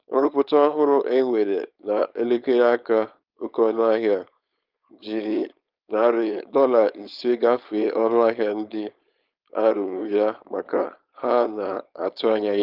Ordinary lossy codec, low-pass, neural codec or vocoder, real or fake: Opus, 24 kbps; 5.4 kHz; codec, 16 kHz, 4.8 kbps, FACodec; fake